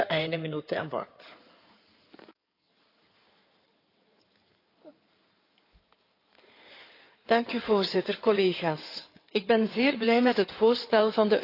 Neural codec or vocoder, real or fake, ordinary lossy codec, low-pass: codec, 16 kHz in and 24 kHz out, 2.2 kbps, FireRedTTS-2 codec; fake; AAC, 32 kbps; 5.4 kHz